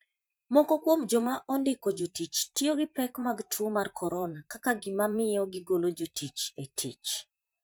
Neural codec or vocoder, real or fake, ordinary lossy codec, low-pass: vocoder, 44.1 kHz, 128 mel bands, Pupu-Vocoder; fake; none; none